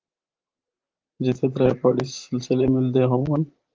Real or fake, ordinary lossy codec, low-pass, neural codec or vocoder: fake; Opus, 24 kbps; 7.2 kHz; vocoder, 44.1 kHz, 128 mel bands, Pupu-Vocoder